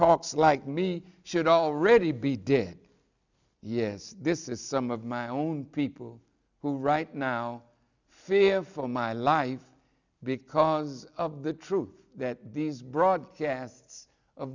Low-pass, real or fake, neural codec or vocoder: 7.2 kHz; real; none